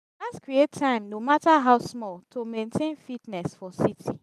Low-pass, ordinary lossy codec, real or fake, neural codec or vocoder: 14.4 kHz; none; real; none